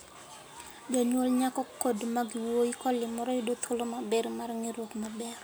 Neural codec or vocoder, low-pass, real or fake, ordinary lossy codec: none; none; real; none